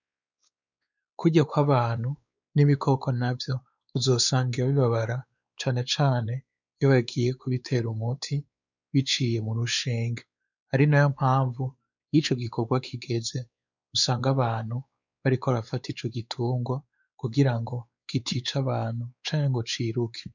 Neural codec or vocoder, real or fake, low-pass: codec, 16 kHz, 4 kbps, X-Codec, WavLM features, trained on Multilingual LibriSpeech; fake; 7.2 kHz